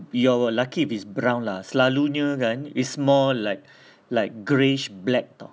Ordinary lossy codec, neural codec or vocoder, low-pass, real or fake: none; none; none; real